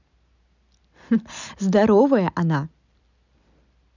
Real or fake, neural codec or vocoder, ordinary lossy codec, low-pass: real; none; none; 7.2 kHz